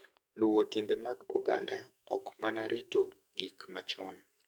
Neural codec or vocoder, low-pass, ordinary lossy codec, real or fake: codec, 44.1 kHz, 2.6 kbps, SNAC; none; none; fake